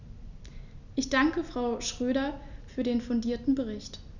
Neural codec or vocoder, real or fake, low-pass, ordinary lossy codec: none; real; 7.2 kHz; none